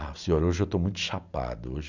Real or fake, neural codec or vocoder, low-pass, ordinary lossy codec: real; none; 7.2 kHz; none